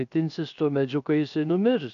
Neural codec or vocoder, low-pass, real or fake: codec, 16 kHz, 0.3 kbps, FocalCodec; 7.2 kHz; fake